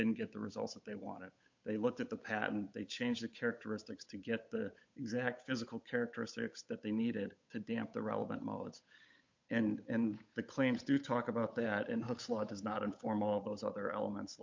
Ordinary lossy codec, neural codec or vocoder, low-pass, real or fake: MP3, 48 kbps; codec, 16 kHz, 8 kbps, FunCodec, trained on Chinese and English, 25 frames a second; 7.2 kHz; fake